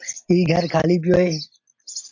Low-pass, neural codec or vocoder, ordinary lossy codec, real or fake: 7.2 kHz; none; AAC, 48 kbps; real